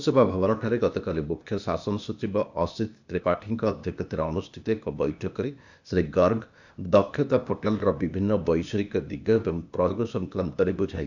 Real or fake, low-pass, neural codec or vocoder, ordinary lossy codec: fake; 7.2 kHz; codec, 16 kHz, 0.8 kbps, ZipCodec; none